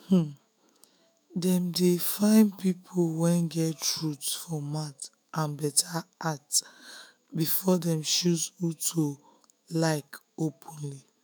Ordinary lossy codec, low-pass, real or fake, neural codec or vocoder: none; none; fake; autoencoder, 48 kHz, 128 numbers a frame, DAC-VAE, trained on Japanese speech